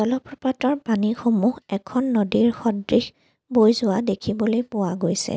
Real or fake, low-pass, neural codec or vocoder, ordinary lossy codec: real; none; none; none